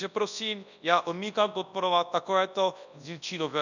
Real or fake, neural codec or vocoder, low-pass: fake; codec, 24 kHz, 0.9 kbps, WavTokenizer, large speech release; 7.2 kHz